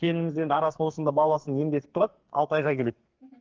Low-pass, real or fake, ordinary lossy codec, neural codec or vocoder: 7.2 kHz; fake; Opus, 16 kbps; codec, 44.1 kHz, 2.6 kbps, SNAC